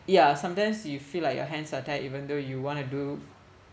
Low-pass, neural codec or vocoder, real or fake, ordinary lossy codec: none; none; real; none